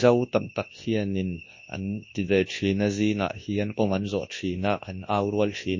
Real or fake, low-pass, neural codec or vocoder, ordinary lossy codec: fake; 7.2 kHz; codec, 24 kHz, 0.9 kbps, WavTokenizer, large speech release; MP3, 32 kbps